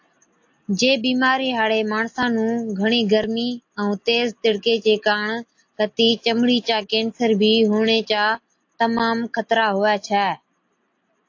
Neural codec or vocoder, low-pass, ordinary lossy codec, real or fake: none; 7.2 kHz; AAC, 48 kbps; real